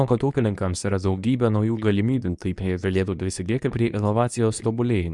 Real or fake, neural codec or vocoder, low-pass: fake; codec, 24 kHz, 0.9 kbps, WavTokenizer, medium speech release version 2; 10.8 kHz